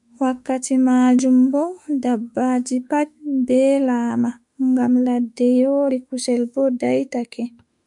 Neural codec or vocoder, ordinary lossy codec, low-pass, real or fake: autoencoder, 48 kHz, 32 numbers a frame, DAC-VAE, trained on Japanese speech; MP3, 96 kbps; 10.8 kHz; fake